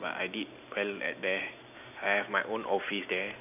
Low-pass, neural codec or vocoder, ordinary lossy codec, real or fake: 3.6 kHz; none; none; real